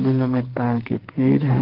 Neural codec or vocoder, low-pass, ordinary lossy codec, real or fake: codec, 44.1 kHz, 2.6 kbps, SNAC; 5.4 kHz; Opus, 16 kbps; fake